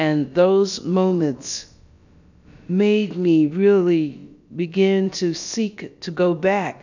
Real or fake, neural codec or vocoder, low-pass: fake; codec, 16 kHz, about 1 kbps, DyCAST, with the encoder's durations; 7.2 kHz